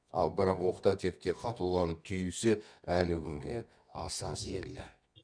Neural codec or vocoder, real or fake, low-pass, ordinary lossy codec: codec, 24 kHz, 0.9 kbps, WavTokenizer, medium music audio release; fake; 9.9 kHz; none